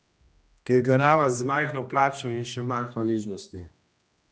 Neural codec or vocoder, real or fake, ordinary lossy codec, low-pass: codec, 16 kHz, 1 kbps, X-Codec, HuBERT features, trained on general audio; fake; none; none